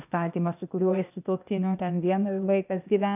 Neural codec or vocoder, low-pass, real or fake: codec, 16 kHz, 0.8 kbps, ZipCodec; 3.6 kHz; fake